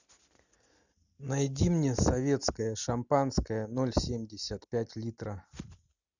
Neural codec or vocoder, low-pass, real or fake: none; 7.2 kHz; real